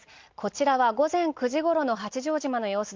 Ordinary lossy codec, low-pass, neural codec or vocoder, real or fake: Opus, 32 kbps; 7.2 kHz; none; real